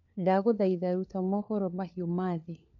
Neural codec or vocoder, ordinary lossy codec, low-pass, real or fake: codec, 16 kHz, 4 kbps, FunCodec, trained on LibriTTS, 50 frames a second; none; 7.2 kHz; fake